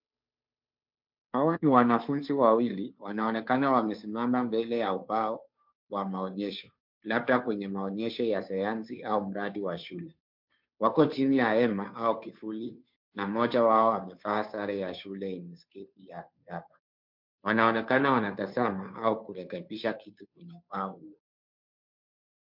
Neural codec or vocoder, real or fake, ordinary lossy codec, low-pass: codec, 16 kHz, 2 kbps, FunCodec, trained on Chinese and English, 25 frames a second; fake; MP3, 48 kbps; 5.4 kHz